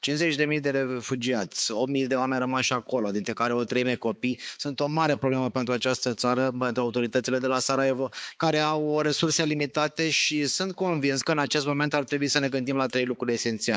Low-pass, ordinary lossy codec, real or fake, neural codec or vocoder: none; none; fake; codec, 16 kHz, 4 kbps, X-Codec, HuBERT features, trained on balanced general audio